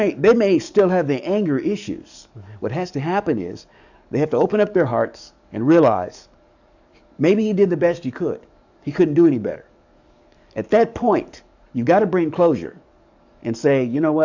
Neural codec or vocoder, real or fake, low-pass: codec, 44.1 kHz, 7.8 kbps, DAC; fake; 7.2 kHz